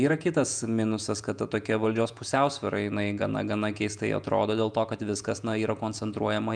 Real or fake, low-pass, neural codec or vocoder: fake; 9.9 kHz; vocoder, 48 kHz, 128 mel bands, Vocos